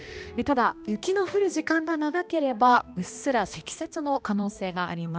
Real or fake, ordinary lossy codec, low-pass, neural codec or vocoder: fake; none; none; codec, 16 kHz, 1 kbps, X-Codec, HuBERT features, trained on balanced general audio